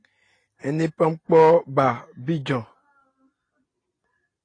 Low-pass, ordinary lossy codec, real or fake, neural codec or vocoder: 9.9 kHz; AAC, 32 kbps; real; none